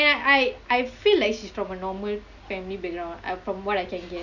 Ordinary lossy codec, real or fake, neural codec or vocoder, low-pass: none; real; none; 7.2 kHz